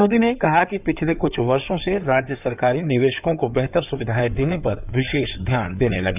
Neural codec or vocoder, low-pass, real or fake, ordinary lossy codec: codec, 16 kHz in and 24 kHz out, 2.2 kbps, FireRedTTS-2 codec; 3.6 kHz; fake; none